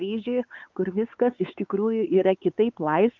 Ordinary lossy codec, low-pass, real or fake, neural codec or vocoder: Opus, 24 kbps; 7.2 kHz; fake; codec, 16 kHz, 4 kbps, X-Codec, WavLM features, trained on Multilingual LibriSpeech